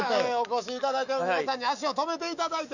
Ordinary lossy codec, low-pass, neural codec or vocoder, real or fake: none; 7.2 kHz; autoencoder, 48 kHz, 128 numbers a frame, DAC-VAE, trained on Japanese speech; fake